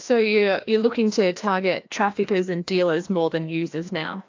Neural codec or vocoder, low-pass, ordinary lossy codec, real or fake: codec, 16 kHz, 1 kbps, FreqCodec, larger model; 7.2 kHz; AAC, 48 kbps; fake